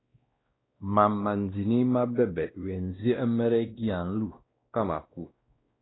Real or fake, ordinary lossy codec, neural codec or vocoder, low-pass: fake; AAC, 16 kbps; codec, 16 kHz, 1 kbps, X-Codec, WavLM features, trained on Multilingual LibriSpeech; 7.2 kHz